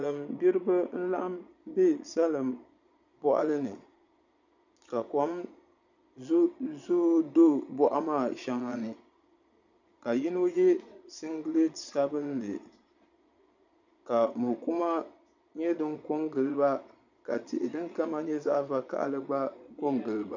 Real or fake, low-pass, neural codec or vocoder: fake; 7.2 kHz; vocoder, 22.05 kHz, 80 mel bands, Vocos